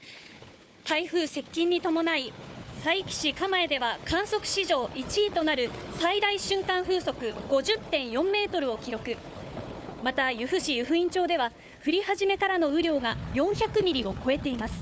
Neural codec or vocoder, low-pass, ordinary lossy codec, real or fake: codec, 16 kHz, 4 kbps, FunCodec, trained on Chinese and English, 50 frames a second; none; none; fake